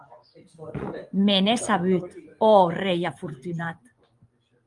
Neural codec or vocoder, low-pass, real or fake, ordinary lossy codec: vocoder, 24 kHz, 100 mel bands, Vocos; 10.8 kHz; fake; Opus, 32 kbps